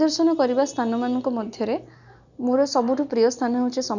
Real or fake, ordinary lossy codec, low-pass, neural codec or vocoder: real; none; 7.2 kHz; none